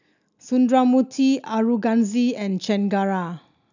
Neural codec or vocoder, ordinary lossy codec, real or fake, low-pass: none; none; real; 7.2 kHz